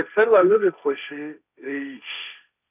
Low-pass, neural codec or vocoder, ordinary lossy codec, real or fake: 3.6 kHz; codec, 16 kHz, 1.1 kbps, Voila-Tokenizer; none; fake